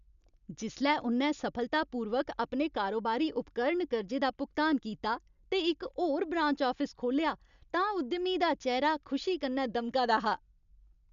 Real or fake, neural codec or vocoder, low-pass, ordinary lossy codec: real; none; 7.2 kHz; none